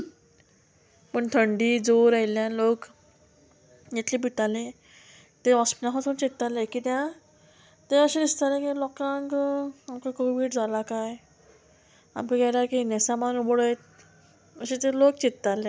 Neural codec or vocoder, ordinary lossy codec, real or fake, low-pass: none; none; real; none